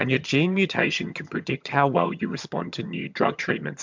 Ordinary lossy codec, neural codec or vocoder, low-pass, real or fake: MP3, 64 kbps; vocoder, 22.05 kHz, 80 mel bands, HiFi-GAN; 7.2 kHz; fake